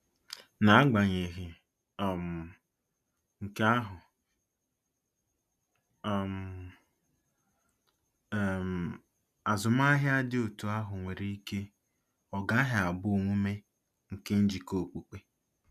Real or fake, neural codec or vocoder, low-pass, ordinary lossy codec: real; none; 14.4 kHz; none